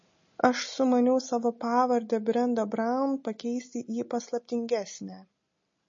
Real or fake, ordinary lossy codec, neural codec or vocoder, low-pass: real; MP3, 32 kbps; none; 7.2 kHz